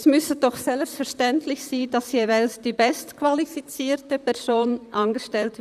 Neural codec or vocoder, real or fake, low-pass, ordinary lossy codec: vocoder, 44.1 kHz, 128 mel bands, Pupu-Vocoder; fake; 14.4 kHz; none